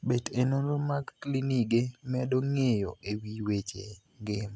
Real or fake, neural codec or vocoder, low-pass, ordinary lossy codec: real; none; none; none